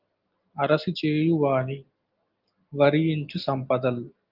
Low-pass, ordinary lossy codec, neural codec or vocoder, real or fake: 5.4 kHz; Opus, 32 kbps; none; real